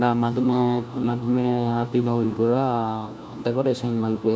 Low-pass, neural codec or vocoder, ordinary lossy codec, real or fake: none; codec, 16 kHz, 1 kbps, FunCodec, trained on LibriTTS, 50 frames a second; none; fake